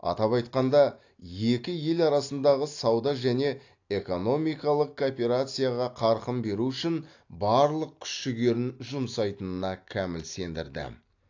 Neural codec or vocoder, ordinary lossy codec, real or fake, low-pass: none; AAC, 48 kbps; real; 7.2 kHz